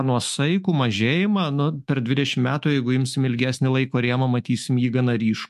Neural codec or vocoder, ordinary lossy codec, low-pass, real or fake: autoencoder, 48 kHz, 128 numbers a frame, DAC-VAE, trained on Japanese speech; MP3, 64 kbps; 14.4 kHz; fake